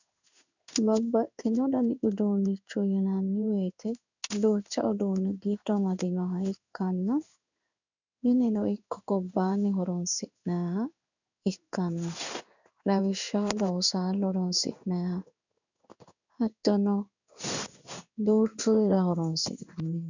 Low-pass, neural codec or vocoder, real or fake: 7.2 kHz; codec, 16 kHz in and 24 kHz out, 1 kbps, XY-Tokenizer; fake